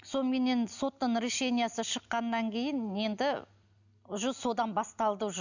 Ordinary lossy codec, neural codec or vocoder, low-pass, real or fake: none; none; 7.2 kHz; real